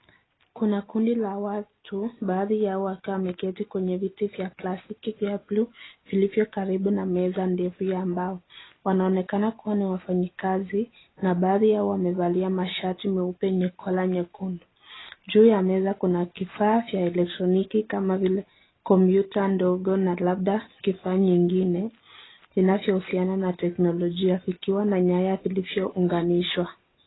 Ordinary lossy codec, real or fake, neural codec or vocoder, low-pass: AAC, 16 kbps; real; none; 7.2 kHz